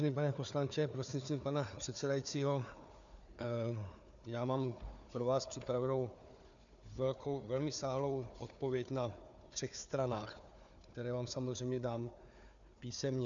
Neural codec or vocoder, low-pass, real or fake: codec, 16 kHz, 4 kbps, FunCodec, trained on Chinese and English, 50 frames a second; 7.2 kHz; fake